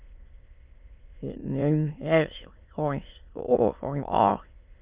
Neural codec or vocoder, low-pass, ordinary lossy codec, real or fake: autoencoder, 22.05 kHz, a latent of 192 numbers a frame, VITS, trained on many speakers; 3.6 kHz; Opus, 32 kbps; fake